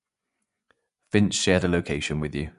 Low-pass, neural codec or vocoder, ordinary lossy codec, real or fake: 10.8 kHz; vocoder, 24 kHz, 100 mel bands, Vocos; none; fake